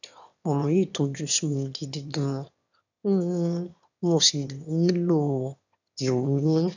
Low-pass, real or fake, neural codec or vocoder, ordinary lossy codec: 7.2 kHz; fake; autoencoder, 22.05 kHz, a latent of 192 numbers a frame, VITS, trained on one speaker; none